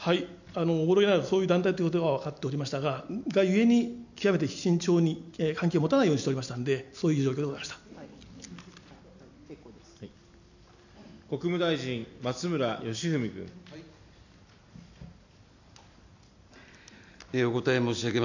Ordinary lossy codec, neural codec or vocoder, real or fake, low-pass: none; none; real; 7.2 kHz